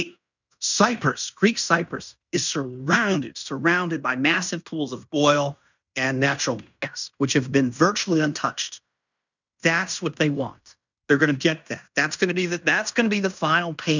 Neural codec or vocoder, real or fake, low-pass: codec, 16 kHz in and 24 kHz out, 0.9 kbps, LongCat-Audio-Codec, fine tuned four codebook decoder; fake; 7.2 kHz